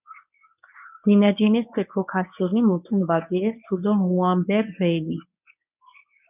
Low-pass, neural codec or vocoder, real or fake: 3.6 kHz; codec, 24 kHz, 0.9 kbps, WavTokenizer, medium speech release version 1; fake